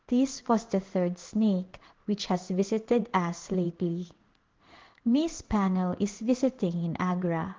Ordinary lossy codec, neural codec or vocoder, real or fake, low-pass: Opus, 16 kbps; codec, 16 kHz in and 24 kHz out, 1 kbps, XY-Tokenizer; fake; 7.2 kHz